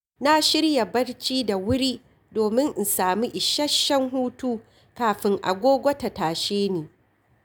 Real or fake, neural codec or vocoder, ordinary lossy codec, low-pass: real; none; none; none